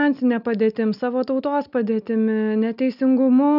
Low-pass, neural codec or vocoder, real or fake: 5.4 kHz; none; real